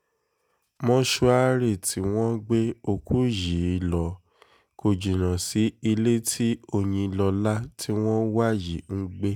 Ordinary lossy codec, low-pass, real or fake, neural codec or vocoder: none; none; real; none